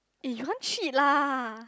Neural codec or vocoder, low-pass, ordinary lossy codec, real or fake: none; none; none; real